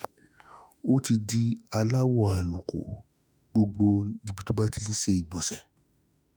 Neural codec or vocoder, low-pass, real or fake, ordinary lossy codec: autoencoder, 48 kHz, 32 numbers a frame, DAC-VAE, trained on Japanese speech; none; fake; none